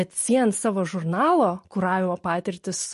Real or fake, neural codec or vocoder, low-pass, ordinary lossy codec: real; none; 14.4 kHz; MP3, 48 kbps